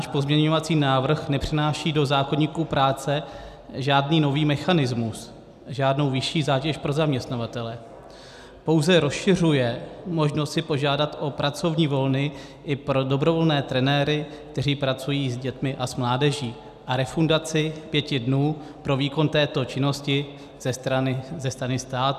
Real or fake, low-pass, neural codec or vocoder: real; 14.4 kHz; none